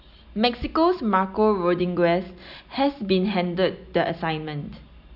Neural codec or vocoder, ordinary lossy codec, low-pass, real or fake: none; none; 5.4 kHz; real